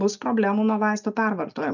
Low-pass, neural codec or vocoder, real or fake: 7.2 kHz; none; real